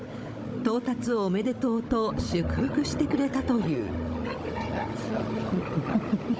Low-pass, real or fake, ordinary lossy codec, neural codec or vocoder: none; fake; none; codec, 16 kHz, 16 kbps, FunCodec, trained on Chinese and English, 50 frames a second